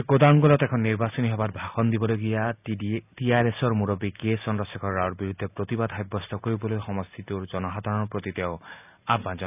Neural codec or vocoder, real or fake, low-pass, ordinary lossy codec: none; real; 3.6 kHz; none